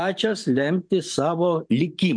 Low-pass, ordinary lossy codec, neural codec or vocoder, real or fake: 9.9 kHz; MP3, 64 kbps; vocoder, 44.1 kHz, 128 mel bands every 512 samples, BigVGAN v2; fake